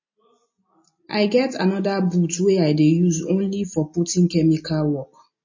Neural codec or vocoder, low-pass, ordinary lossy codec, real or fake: none; 7.2 kHz; MP3, 32 kbps; real